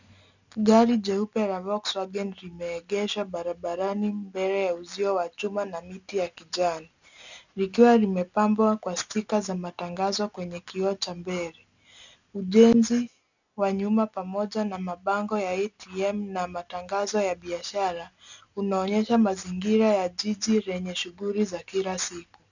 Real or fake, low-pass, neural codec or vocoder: real; 7.2 kHz; none